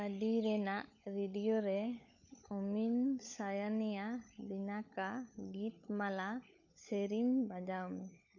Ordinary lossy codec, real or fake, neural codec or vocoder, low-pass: none; fake; codec, 16 kHz, 16 kbps, FunCodec, trained on LibriTTS, 50 frames a second; 7.2 kHz